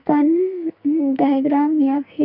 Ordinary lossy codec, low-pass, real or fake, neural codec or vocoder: none; 5.4 kHz; fake; codec, 44.1 kHz, 2.6 kbps, SNAC